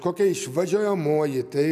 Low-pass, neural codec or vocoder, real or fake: 14.4 kHz; none; real